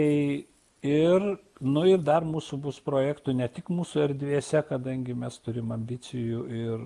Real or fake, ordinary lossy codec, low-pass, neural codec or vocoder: real; Opus, 32 kbps; 10.8 kHz; none